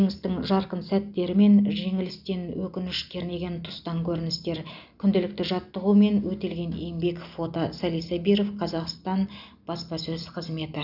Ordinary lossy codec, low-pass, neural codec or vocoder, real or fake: none; 5.4 kHz; none; real